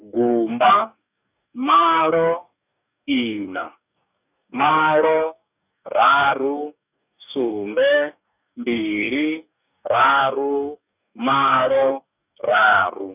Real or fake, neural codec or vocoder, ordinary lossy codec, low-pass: fake; codec, 44.1 kHz, 2.6 kbps, DAC; none; 3.6 kHz